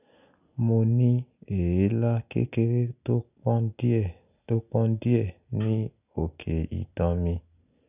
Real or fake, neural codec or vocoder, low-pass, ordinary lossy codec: real; none; 3.6 kHz; none